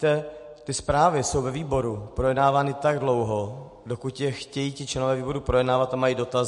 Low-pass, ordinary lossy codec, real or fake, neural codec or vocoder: 14.4 kHz; MP3, 48 kbps; real; none